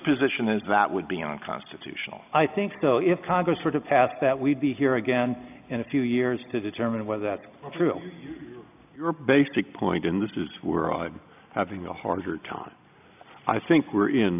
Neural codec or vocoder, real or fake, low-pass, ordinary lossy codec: none; real; 3.6 kHz; AAC, 32 kbps